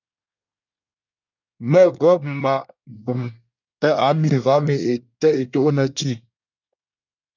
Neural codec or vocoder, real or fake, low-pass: codec, 24 kHz, 1 kbps, SNAC; fake; 7.2 kHz